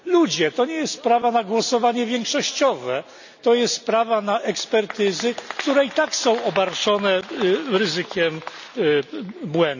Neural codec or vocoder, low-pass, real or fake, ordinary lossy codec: none; 7.2 kHz; real; none